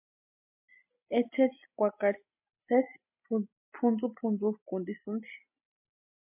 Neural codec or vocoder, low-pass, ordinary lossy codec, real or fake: none; 3.6 kHz; MP3, 32 kbps; real